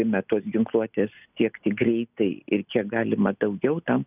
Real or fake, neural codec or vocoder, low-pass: real; none; 3.6 kHz